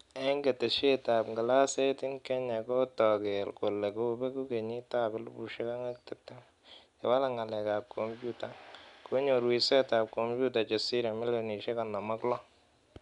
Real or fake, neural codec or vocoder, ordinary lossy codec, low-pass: real; none; none; 10.8 kHz